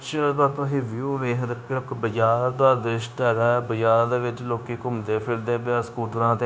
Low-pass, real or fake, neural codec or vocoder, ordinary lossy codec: none; fake; codec, 16 kHz, 0.9 kbps, LongCat-Audio-Codec; none